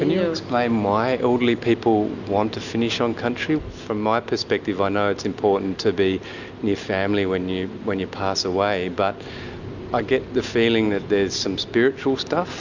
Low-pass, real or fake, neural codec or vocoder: 7.2 kHz; real; none